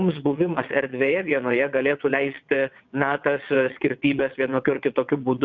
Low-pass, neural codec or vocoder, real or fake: 7.2 kHz; vocoder, 22.05 kHz, 80 mel bands, WaveNeXt; fake